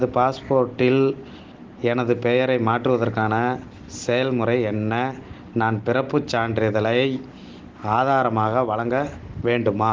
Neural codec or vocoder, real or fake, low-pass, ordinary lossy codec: none; real; 7.2 kHz; Opus, 16 kbps